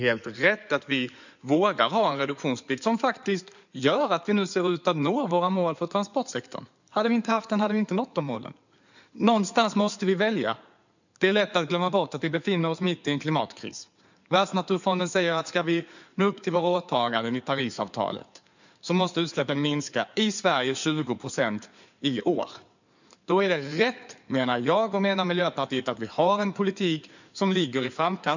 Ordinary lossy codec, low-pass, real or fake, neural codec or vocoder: none; 7.2 kHz; fake; codec, 16 kHz in and 24 kHz out, 2.2 kbps, FireRedTTS-2 codec